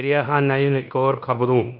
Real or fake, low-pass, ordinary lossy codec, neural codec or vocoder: fake; 5.4 kHz; none; codec, 16 kHz in and 24 kHz out, 0.9 kbps, LongCat-Audio-Codec, fine tuned four codebook decoder